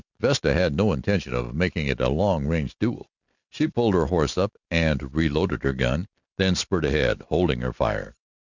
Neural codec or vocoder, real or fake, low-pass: none; real; 7.2 kHz